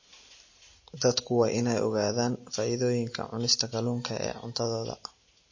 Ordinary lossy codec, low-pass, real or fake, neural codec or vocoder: MP3, 32 kbps; 7.2 kHz; real; none